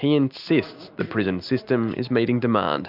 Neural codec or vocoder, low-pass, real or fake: none; 5.4 kHz; real